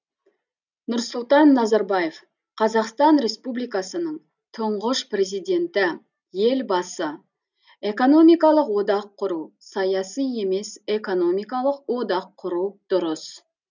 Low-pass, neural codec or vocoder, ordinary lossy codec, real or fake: 7.2 kHz; none; none; real